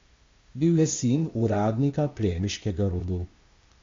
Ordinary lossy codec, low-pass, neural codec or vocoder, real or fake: MP3, 48 kbps; 7.2 kHz; codec, 16 kHz, 0.8 kbps, ZipCodec; fake